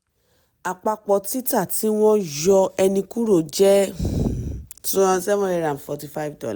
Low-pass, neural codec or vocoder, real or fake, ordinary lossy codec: none; none; real; none